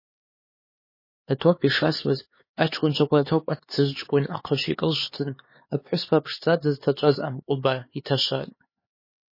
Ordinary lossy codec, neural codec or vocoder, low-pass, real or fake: MP3, 24 kbps; codec, 16 kHz, 4 kbps, X-Codec, HuBERT features, trained on LibriSpeech; 5.4 kHz; fake